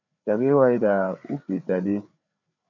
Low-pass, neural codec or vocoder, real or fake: 7.2 kHz; codec, 16 kHz, 4 kbps, FreqCodec, larger model; fake